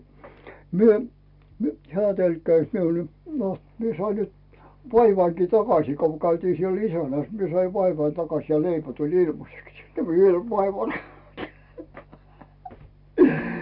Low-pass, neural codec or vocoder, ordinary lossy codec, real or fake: 5.4 kHz; none; none; real